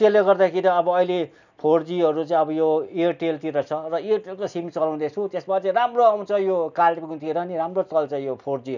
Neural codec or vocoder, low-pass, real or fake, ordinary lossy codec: none; 7.2 kHz; real; none